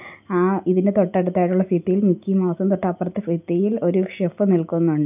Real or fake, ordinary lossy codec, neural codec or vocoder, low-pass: real; none; none; 3.6 kHz